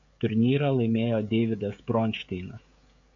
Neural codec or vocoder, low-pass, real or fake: codec, 16 kHz, 16 kbps, FreqCodec, smaller model; 7.2 kHz; fake